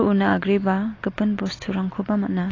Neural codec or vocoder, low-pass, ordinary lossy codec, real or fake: none; 7.2 kHz; AAC, 32 kbps; real